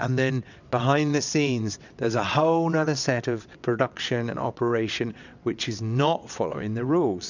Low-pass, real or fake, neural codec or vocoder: 7.2 kHz; fake; vocoder, 22.05 kHz, 80 mel bands, WaveNeXt